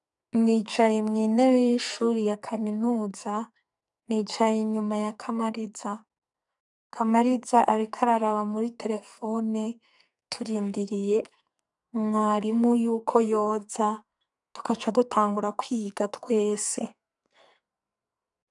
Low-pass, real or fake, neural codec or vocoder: 10.8 kHz; fake; codec, 44.1 kHz, 2.6 kbps, SNAC